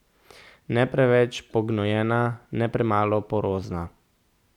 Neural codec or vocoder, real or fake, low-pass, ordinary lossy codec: none; real; 19.8 kHz; none